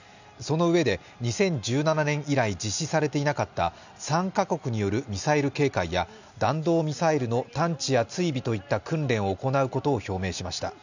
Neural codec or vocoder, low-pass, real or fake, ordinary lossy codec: none; 7.2 kHz; real; none